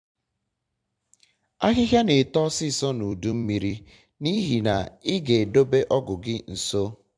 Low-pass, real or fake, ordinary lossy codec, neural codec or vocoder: 9.9 kHz; fake; MP3, 64 kbps; vocoder, 44.1 kHz, 128 mel bands every 256 samples, BigVGAN v2